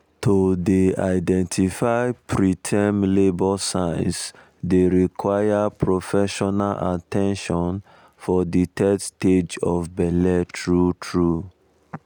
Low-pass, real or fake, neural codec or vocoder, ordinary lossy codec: none; real; none; none